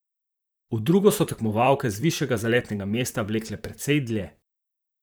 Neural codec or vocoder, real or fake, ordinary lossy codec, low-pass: vocoder, 44.1 kHz, 128 mel bands, Pupu-Vocoder; fake; none; none